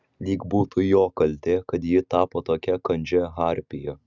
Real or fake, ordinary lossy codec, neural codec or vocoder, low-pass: real; Opus, 64 kbps; none; 7.2 kHz